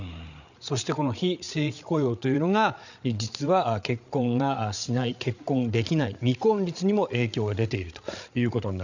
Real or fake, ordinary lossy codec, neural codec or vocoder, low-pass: fake; none; codec, 16 kHz, 8 kbps, FreqCodec, larger model; 7.2 kHz